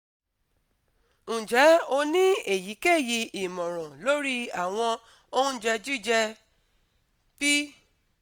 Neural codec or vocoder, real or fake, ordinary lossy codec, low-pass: none; real; none; none